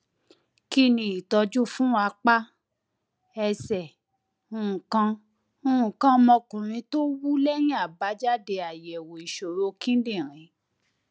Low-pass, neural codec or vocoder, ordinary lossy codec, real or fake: none; none; none; real